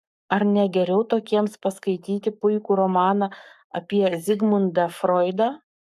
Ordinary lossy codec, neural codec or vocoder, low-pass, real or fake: AAC, 96 kbps; codec, 44.1 kHz, 7.8 kbps, Pupu-Codec; 14.4 kHz; fake